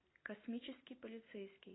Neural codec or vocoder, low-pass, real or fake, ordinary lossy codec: none; 7.2 kHz; real; AAC, 16 kbps